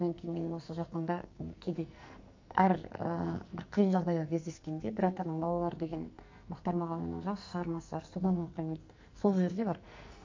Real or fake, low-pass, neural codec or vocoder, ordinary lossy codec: fake; 7.2 kHz; codec, 44.1 kHz, 2.6 kbps, SNAC; MP3, 64 kbps